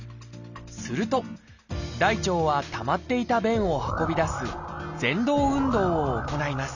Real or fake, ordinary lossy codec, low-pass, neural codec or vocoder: real; none; 7.2 kHz; none